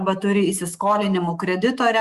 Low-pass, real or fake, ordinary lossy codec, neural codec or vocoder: 14.4 kHz; fake; Opus, 64 kbps; autoencoder, 48 kHz, 128 numbers a frame, DAC-VAE, trained on Japanese speech